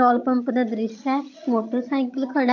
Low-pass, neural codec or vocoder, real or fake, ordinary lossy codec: 7.2 kHz; vocoder, 22.05 kHz, 80 mel bands, HiFi-GAN; fake; AAC, 48 kbps